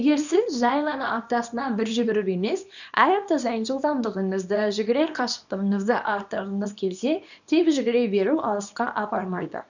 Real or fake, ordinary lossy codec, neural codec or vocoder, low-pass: fake; none; codec, 24 kHz, 0.9 kbps, WavTokenizer, small release; 7.2 kHz